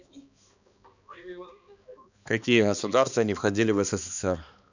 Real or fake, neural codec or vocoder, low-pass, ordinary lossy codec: fake; codec, 16 kHz, 2 kbps, X-Codec, HuBERT features, trained on balanced general audio; 7.2 kHz; none